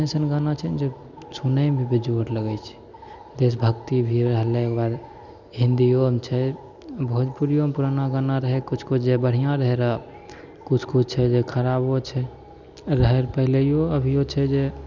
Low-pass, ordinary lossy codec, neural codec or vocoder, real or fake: 7.2 kHz; none; none; real